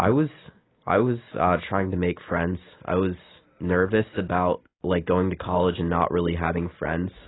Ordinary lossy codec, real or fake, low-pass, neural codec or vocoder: AAC, 16 kbps; real; 7.2 kHz; none